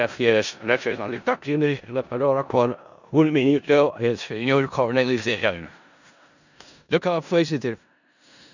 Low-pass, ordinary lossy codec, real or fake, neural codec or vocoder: 7.2 kHz; none; fake; codec, 16 kHz in and 24 kHz out, 0.4 kbps, LongCat-Audio-Codec, four codebook decoder